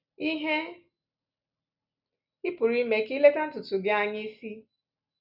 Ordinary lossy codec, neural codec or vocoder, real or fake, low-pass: none; none; real; 5.4 kHz